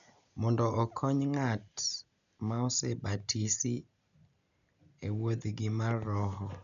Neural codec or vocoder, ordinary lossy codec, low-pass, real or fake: none; none; 7.2 kHz; real